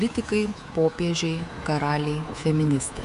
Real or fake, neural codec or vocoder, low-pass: fake; codec, 24 kHz, 3.1 kbps, DualCodec; 10.8 kHz